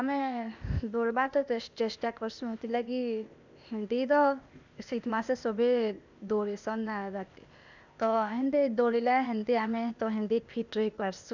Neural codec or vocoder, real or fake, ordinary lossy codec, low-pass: codec, 16 kHz, 0.8 kbps, ZipCodec; fake; none; 7.2 kHz